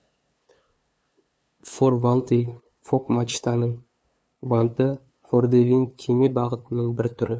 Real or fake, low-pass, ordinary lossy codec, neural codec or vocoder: fake; none; none; codec, 16 kHz, 2 kbps, FunCodec, trained on LibriTTS, 25 frames a second